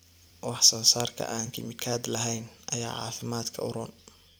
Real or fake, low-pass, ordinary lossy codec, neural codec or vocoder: fake; none; none; vocoder, 44.1 kHz, 128 mel bands every 256 samples, BigVGAN v2